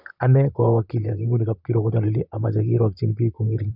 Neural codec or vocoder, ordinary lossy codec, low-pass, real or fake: vocoder, 44.1 kHz, 128 mel bands, Pupu-Vocoder; none; 5.4 kHz; fake